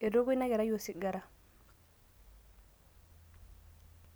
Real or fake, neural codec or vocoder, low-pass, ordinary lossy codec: real; none; none; none